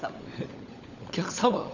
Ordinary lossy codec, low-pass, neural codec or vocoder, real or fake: none; 7.2 kHz; codec, 16 kHz, 16 kbps, FunCodec, trained on LibriTTS, 50 frames a second; fake